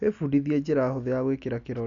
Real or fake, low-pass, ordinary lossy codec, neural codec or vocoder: real; 7.2 kHz; none; none